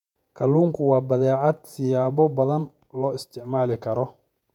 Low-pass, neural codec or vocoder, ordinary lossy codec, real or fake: 19.8 kHz; vocoder, 48 kHz, 128 mel bands, Vocos; none; fake